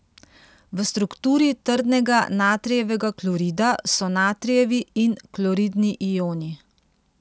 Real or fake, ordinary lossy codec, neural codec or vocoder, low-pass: real; none; none; none